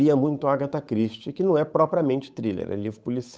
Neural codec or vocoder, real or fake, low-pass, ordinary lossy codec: codec, 16 kHz, 8 kbps, FunCodec, trained on Chinese and English, 25 frames a second; fake; none; none